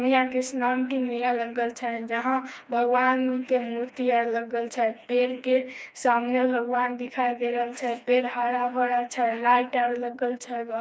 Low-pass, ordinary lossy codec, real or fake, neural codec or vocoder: none; none; fake; codec, 16 kHz, 2 kbps, FreqCodec, smaller model